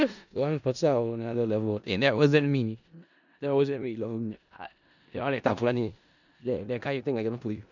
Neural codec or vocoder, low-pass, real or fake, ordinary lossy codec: codec, 16 kHz in and 24 kHz out, 0.4 kbps, LongCat-Audio-Codec, four codebook decoder; 7.2 kHz; fake; none